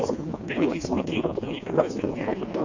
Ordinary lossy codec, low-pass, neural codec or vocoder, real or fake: AAC, 48 kbps; 7.2 kHz; codec, 16 kHz, 2 kbps, FreqCodec, smaller model; fake